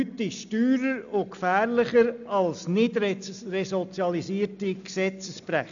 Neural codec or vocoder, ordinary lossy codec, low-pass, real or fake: none; MP3, 96 kbps; 7.2 kHz; real